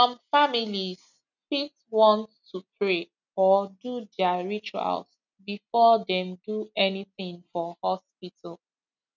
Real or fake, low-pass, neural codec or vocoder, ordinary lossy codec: real; 7.2 kHz; none; none